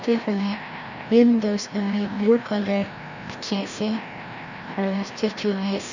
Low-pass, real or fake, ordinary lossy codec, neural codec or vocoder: 7.2 kHz; fake; none; codec, 16 kHz, 1 kbps, FreqCodec, larger model